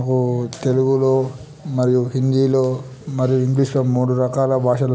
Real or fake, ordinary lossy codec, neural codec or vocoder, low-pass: real; none; none; none